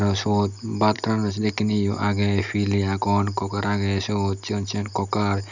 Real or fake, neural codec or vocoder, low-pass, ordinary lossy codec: real; none; 7.2 kHz; none